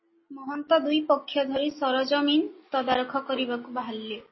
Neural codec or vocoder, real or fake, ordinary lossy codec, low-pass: none; real; MP3, 24 kbps; 7.2 kHz